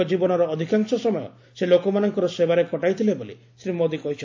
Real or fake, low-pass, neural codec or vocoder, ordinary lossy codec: real; 7.2 kHz; none; AAC, 48 kbps